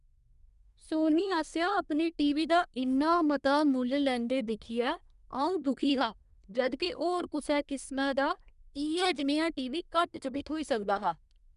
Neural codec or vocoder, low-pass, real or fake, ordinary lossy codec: codec, 24 kHz, 1 kbps, SNAC; 10.8 kHz; fake; none